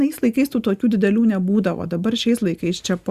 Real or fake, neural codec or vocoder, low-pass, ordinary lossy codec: real; none; 14.4 kHz; Opus, 64 kbps